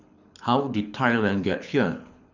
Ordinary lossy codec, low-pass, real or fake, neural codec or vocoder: none; 7.2 kHz; fake; codec, 24 kHz, 6 kbps, HILCodec